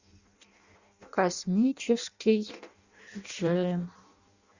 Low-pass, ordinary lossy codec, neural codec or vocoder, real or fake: 7.2 kHz; Opus, 64 kbps; codec, 16 kHz in and 24 kHz out, 0.6 kbps, FireRedTTS-2 codec; fake